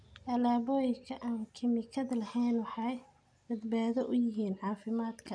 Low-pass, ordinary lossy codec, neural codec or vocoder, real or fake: 9.9 kHz; none; none; real